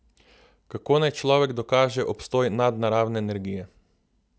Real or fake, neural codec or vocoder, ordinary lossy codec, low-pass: real; none; none; none